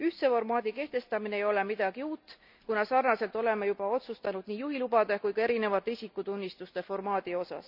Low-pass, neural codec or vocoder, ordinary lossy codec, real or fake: 5.4 kHz; none; none; real